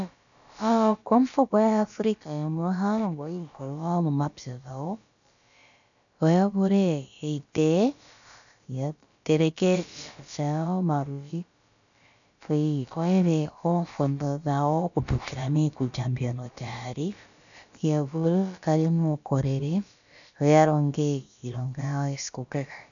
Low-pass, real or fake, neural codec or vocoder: 7.2 kHz; fake; codec, 16 kHz, about 1 kbps, DyCAST, with the encoder's durations